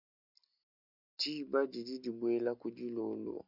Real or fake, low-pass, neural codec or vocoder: real; 5.4 kHz; none